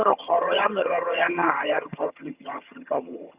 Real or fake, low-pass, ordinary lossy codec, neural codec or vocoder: fake; 3.6 kHz; Opus, 64 kbps; vocoder, 22.05 kHz, 80 mel bands, HiFi-GAN